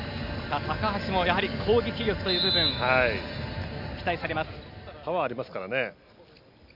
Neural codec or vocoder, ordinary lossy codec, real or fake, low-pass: none; none; real; 5.4 kHz